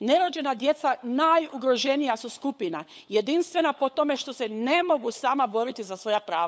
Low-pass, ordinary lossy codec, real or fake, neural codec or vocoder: none; none; fake; codec, 16 kHz, 8 kbps, FunCodec, trained on LibriTTS, 25 frames a second